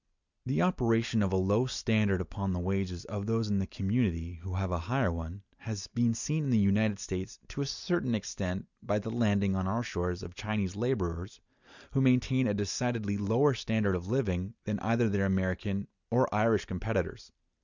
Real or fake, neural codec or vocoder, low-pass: real; none; 7.2 kHz